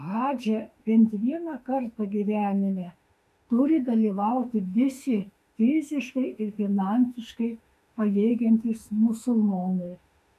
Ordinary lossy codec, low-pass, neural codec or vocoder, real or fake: MP3, 96 kbps; 14.4 kHz; autoencoder, 48 kHz, 32 numbers a frame, DAC-VAE, trained on Japanese speech; fake